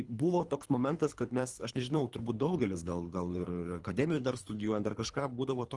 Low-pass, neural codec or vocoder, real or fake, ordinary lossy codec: 10.8 kHz; codec, 24 kHz, 3 kbps, HILCodec; fake; Opus, 24 kbps